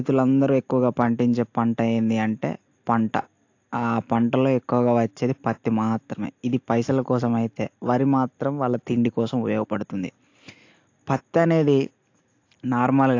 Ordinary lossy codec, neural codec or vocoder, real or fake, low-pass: AAC, 48 kbps; none; real; 7.2 kHz